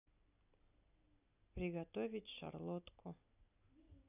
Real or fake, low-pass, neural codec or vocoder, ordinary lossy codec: real; 3.6 kHz; none; none